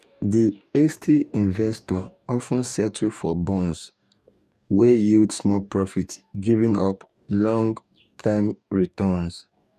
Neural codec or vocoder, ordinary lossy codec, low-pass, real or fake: codec, 44.1 kHz, 2.6 kbps, DAC; none; 14.4 kHz; fake